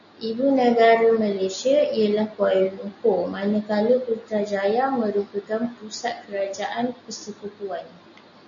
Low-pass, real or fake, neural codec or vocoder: 7.2 kHz; real; none